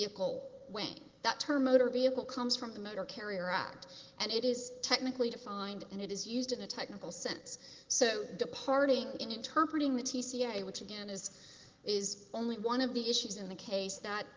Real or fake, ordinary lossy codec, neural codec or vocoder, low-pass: real; Opus, 16 kbps; none; 7.2 kHz